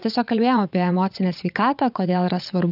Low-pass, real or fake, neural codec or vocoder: 5.4 kHz; fake; vocoder, 22.05 kHz, 80 mel bands, WaveNeXt